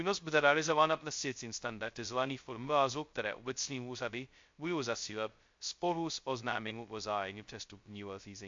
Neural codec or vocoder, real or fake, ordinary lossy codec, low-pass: codec, 16 kHz, 0.2 kbps, FocalCodec; fake; MP3, 48 kbps; 7.2 kHz